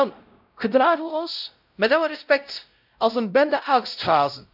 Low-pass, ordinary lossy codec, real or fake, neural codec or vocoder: 5.4 kHz; none; fake; codec, 16 kHz, 0.5 kbps, X-Codec, WavLM features, trained on Multilingual LibriSpeech